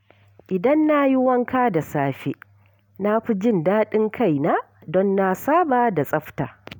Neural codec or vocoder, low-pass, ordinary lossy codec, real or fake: none; none; none; real